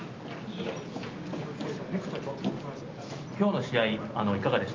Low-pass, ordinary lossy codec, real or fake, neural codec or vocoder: 7.2 kHz; Opus, 24 kbps; real; none